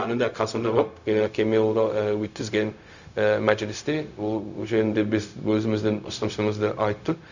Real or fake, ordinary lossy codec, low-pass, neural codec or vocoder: fake; none; 7.2 kHz; codec, 16 kHz, 0.4 kbps, LongCat-Audio-Codec